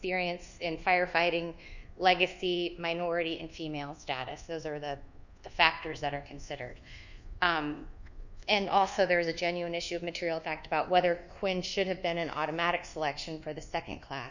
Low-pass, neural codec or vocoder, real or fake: 7.2 kHz; codec, 24 kHz, 1.2 kbps, DualCodec; fake